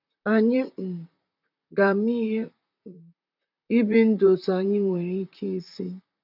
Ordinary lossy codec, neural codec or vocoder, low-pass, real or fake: none; vocoder, 44.1 kHz, 128 mel bands, Pupu-Vocoder; 5.4 kHz; fake